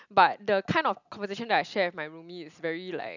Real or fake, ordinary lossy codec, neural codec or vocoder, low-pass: real; none; none; 7.2 kHz